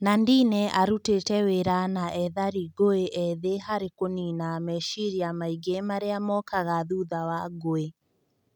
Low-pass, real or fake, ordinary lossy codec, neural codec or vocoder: 19.8 kHz; real; none; none